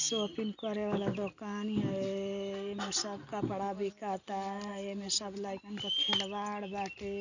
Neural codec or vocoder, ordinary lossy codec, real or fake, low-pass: none; none; real; 7.2 kHz